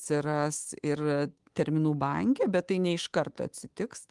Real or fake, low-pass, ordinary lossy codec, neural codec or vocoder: fake; 10.8 kHz; Opus, 24 kbps; codec, 24 kHz, 3.1 kbps, DualCodec